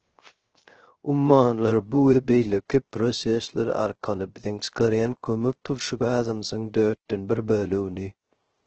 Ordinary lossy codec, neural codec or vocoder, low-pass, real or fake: Opus, 16 kbps; codec, 16 kHz, 0.3 kbps, FocalCodec; 7.2 kHz; fake